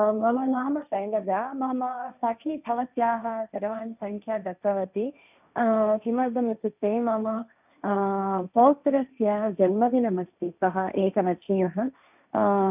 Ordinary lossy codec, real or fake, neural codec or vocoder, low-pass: none; fake; codec, 16 kHz, 1.1 kbps, Voila-Tokenizer; 3.6 kHz